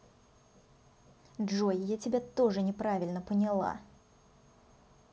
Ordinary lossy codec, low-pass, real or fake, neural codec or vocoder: none; none; real; none